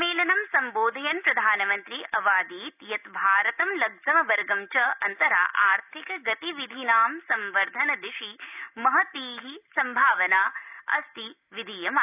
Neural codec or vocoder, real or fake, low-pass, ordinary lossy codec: none; real; 3.6 kHz; none